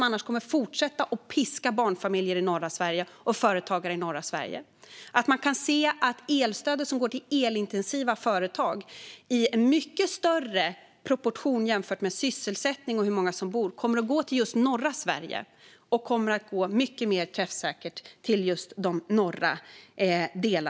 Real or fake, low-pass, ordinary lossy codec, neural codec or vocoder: real; none; none; none